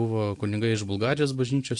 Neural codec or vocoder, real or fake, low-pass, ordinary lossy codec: none; real; 10.8 kHz; AAC, 64 kbps